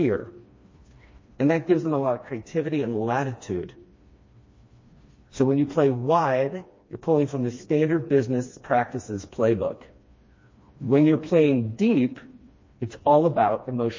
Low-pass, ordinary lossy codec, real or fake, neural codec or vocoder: 7.2 kHz; MP3, 32 kbps; fake; codec, 16 kHz, 2 kbps, FreqCodec, smaller model